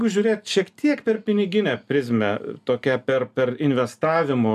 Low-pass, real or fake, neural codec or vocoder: 14.4 kHz; real; none